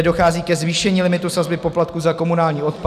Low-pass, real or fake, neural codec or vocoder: 14.4 kHz; real; none